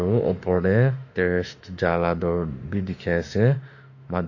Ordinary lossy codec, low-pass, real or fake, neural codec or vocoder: AAC, 48 kbps; 7.2 kHz; fake; autoencoder, 48 kHz, 32 numbers a frame, DAC-VAE, trained on Japanese speech